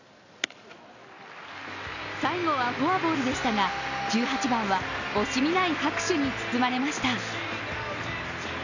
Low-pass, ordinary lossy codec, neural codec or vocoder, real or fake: 7.2 kHz; none; none; real